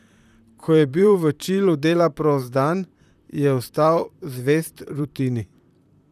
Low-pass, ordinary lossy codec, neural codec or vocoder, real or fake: 14.4 kHz; none; vocoder, 44.1 kHz, 128 mel bands, Pupu-Vocoder; fake